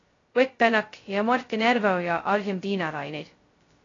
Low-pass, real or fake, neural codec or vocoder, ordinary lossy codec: 7.2 kHz; fake; codec, 16 kHz, 0.2 kbps, FocalCodec; AAC, 32 kbps